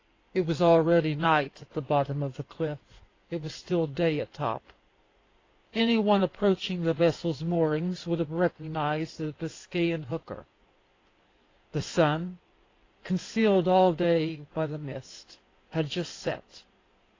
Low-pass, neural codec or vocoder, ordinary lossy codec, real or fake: 7.2 kHz; codec, 16 kHz in and 24 kHz out, 2.2 kbps, FireRedTTS-2 codec; AAC, 32 kbps; fake